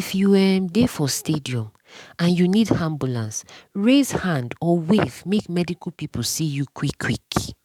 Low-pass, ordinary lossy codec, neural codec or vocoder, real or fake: 19.8 kHz; none; codec, 44.1 kHz, 7.8 kbps, DAC; fake